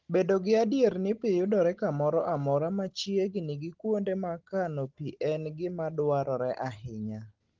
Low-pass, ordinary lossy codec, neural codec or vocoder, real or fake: 7.2 kHz; Opus, 16 kbps; none; real